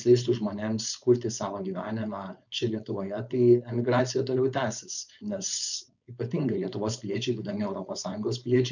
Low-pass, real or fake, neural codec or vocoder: 7.2 kHz; fake; codec, 16 kHz, 4.8 kbps, FACodec